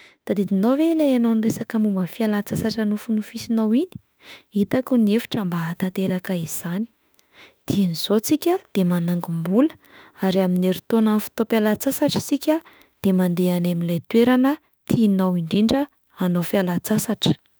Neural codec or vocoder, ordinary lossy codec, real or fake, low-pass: autoencoder, 48 kHz, 32 numbers a frame, DAC-VAE, trained on Japanese speech; none; fake; none